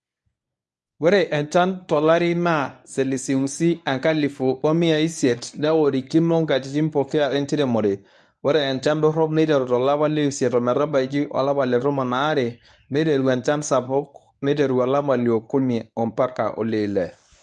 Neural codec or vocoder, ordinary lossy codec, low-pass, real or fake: codec, 24 kHz, 0.9 kbps, WavTokenizer, medium speech release version 1; none; none; fake